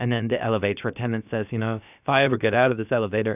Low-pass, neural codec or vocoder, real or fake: 3.6 kHz; codec, 16 kHz, about 1 kbps, DyCAST, with the encoder's durations; fake